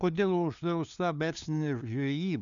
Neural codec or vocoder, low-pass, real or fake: codec, 16 kHz, 2 kbps, FunCodec, trained on LibriTTS, 25 frames a second; 7.2 kHz; fake